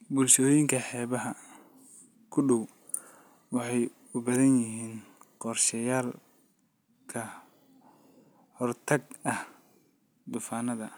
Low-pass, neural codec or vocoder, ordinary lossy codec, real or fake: none; none; none; real